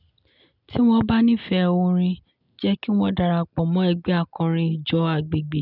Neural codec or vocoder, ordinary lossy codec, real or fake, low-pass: none; none; real; 5.4 kHz